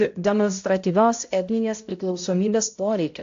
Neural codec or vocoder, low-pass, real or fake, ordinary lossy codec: codec, 16 kHz, 0.5 kbps, X-Codec, HuBERT features, trained on balanced general audio; 7.2 kHz; fake; AAC, 48 kbps